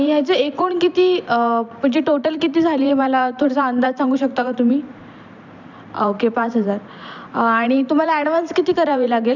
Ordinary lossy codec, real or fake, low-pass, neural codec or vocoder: none; fake; 7.2 kHz; vocoder, 44.1 kHz, 128 mel bands every 512 samples, BigVGAN v2